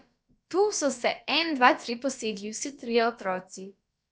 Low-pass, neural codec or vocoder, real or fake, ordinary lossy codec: none; codec, 16 kHz, about 1 kbps, DyCAST, with the encoder's durations; fake; none